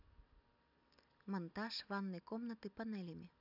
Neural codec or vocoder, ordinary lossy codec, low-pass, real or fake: none; none; 5.4 kHz; real